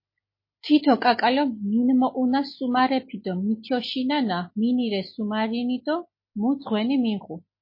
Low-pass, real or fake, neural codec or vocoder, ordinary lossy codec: 5.4 kHz; real; none; MP3, 24 kbps